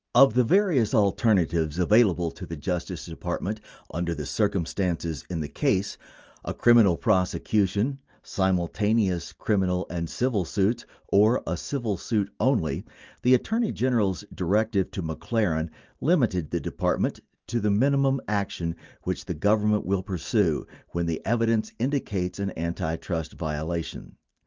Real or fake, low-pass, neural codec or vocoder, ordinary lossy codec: real; 7.2 kHz; none; Opus, 24 kbps